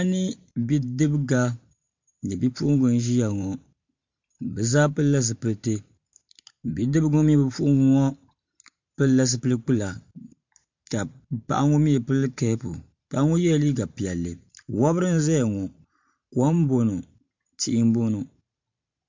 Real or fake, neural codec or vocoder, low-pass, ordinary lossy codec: fake; vocoder, 44.1 kHz, 128 mel bands every 512 samples, BigVGAN v2; 7.2 kHz; MP3, 48 kbps